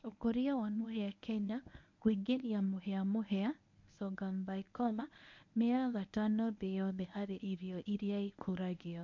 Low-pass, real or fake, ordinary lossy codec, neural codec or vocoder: 7.2 kHz; fake; MP3, 48 kbps; codec, 24 kHz, 0.9 kbps, WavTokenizer, medium speech release version 1